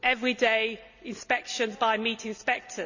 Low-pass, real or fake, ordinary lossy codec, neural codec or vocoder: 7.2 kHz; real; none; none